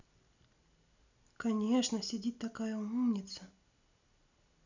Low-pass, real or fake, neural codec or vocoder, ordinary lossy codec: 7.2 kHz; real; none; none